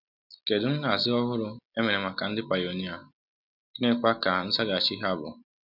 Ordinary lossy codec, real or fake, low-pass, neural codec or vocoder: none; real; 5.4 kHz; none